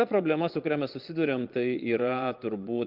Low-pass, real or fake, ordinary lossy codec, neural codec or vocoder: 5.4 kHz; fake; Opus, 24 kbps; vocoder, 22.05 kHz, 80 mel bands, Vocos